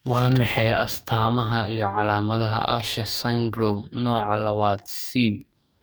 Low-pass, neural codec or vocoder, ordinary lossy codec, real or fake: none; codec, 44.1 kHz, 2.6 kbps, DAC; none; fake